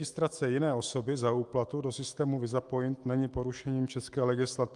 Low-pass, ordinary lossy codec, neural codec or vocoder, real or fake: 10.8 kHz; Opus, 32 kbps; autoencoder, 48 kHz, 128 numbers a frame, DAC-VAE, trained on Japanese speech; fake